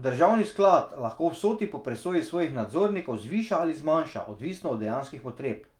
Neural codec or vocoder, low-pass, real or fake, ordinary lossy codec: autoencoder, 48 kHz, 128 numbers a frame, DAC-VAE, trained on Japanese speech; 19.8 kHz; fake; Opus, 32 kbps